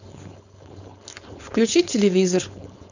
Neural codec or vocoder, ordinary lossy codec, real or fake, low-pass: codec, 16 kHz, 4.8 kbps, FACodec; none; fake; 7.2 kHz